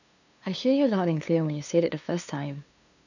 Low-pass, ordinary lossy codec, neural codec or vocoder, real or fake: 7.2 kHz; none; codec, 16 kHz, 2 kbps, FunCodec, trained on LibriTTS, 25 frames a second; fake